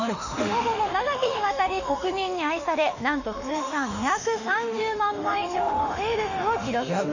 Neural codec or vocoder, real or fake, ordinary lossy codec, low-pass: autoencoder, 48 kHz, 32 numbers a frame, DAC-VAE, trained on Japanese speech; fake; none; 7.2 kHz